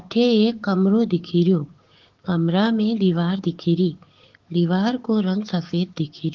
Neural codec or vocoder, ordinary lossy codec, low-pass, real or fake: codec, 16 kHz, 6 kbps, DAC; Opus, 16 kbps; 7.2 kHz; fake